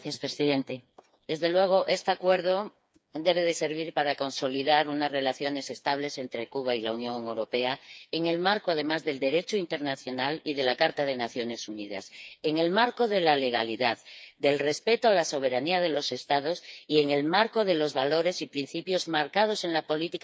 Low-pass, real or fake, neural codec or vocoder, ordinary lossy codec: none; fake; codec, 16 kHz, 4 kbps, FreqCodec, smaller model; none